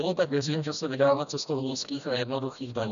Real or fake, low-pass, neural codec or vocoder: fake; 7.2 kHz; codec, 16 kHz, 1 kbps, FreqCodec, smaller model